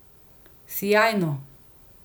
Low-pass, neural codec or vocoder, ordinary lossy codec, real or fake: none; none; none; real